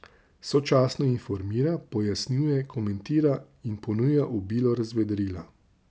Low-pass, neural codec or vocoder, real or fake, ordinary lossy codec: none; none; real; none